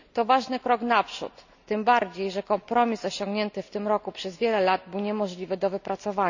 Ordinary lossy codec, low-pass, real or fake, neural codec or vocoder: none; 7.2 kHz; real; none